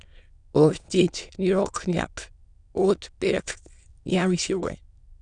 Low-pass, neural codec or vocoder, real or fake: 9.9 kHz; autoencoder, 22.05 kHz, a latent of 192 numbers a frame, VITS, trained on many speakers; fake